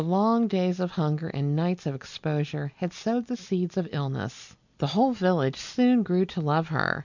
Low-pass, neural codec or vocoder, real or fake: 7.2 kHz; none; real